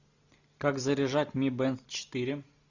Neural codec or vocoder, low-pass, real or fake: none; 7.2 kHz; real